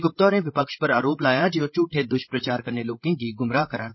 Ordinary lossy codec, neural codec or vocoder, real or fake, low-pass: MP3, 24 kbps; codec, 16 kHz, 16 kbps, FreqCodec, larger model; fake; 7.2 kHz